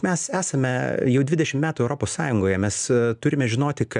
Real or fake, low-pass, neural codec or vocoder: real; 10.8 kHz; none